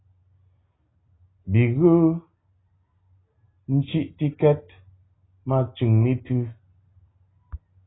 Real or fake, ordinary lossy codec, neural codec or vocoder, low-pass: real; AAC, 16 kbps; none; 7.2 kHz